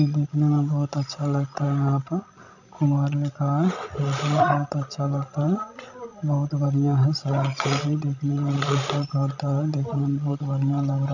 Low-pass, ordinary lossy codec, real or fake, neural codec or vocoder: 7.2 kHz; none; fake; codec, 16 kHz, 16 kbps, FreqCodec, larger model